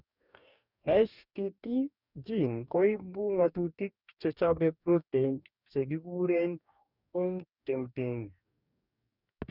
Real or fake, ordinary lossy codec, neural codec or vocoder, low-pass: fake; none; codec, 44.1 kHz, 2.6 kbps, DAC; 5.4 kHz